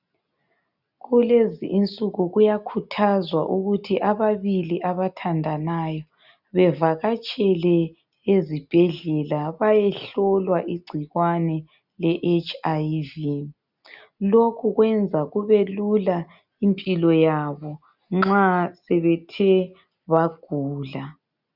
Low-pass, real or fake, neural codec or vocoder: 5.4 kHz; real; none